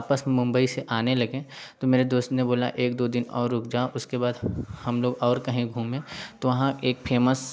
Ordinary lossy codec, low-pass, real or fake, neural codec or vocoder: none; none; real; none